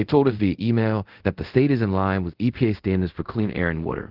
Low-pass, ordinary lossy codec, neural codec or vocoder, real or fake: 5.4 kHz; Opus, 16 kbps; codec, 24 kHz, 0.5 kbps, DualCodec; fake